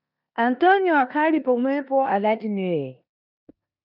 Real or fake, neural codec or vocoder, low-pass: fake; codec, 16 kHz in and 24 kHz out, 0.9 kbps, LongCat-Audio-Codec, four codebook decoder; 5.4 kHz